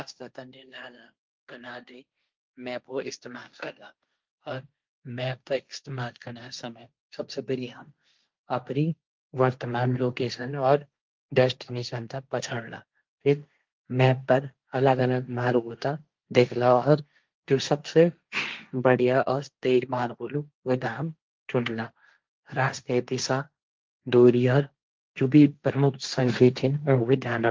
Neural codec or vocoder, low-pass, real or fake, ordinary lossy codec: codec, 16 kHz, 1.1 kbps, Voila-Tokenizer; 7.2 kHz; fake; Opus, 32 kbps